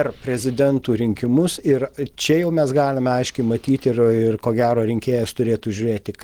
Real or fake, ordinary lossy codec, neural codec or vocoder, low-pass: real; Opus, 16 kbps; none; 19.8 kHz